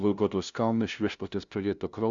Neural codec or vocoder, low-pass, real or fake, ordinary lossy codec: codec, 16 kHz, 0.5 kbps, FunCodec, trained on LibriTTS, 25 frames a second; 7.2 kHz; fake; Opus, 64 kbps